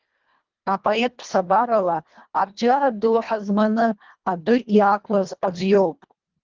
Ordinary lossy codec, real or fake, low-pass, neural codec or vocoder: Opus, 32 kbps; fake; 7.2 kHz; codec, 24 kHz, 1.5 kbps, HILCodec